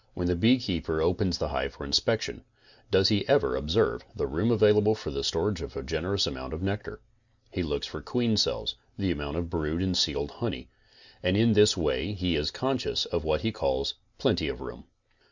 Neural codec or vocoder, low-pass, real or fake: none; 7.2 kHz; real